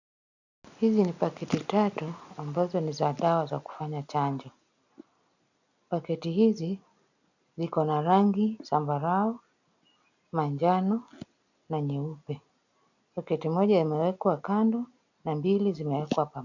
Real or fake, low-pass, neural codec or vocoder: real; 7.2 kHz; none